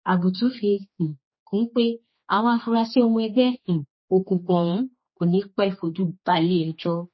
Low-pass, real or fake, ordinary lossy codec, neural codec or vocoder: 7.2 kHz; fake; MP3, 24 kbps; codec, 16 kHz, 2 kbps, X-Codec, HuBERT features, trained on general audio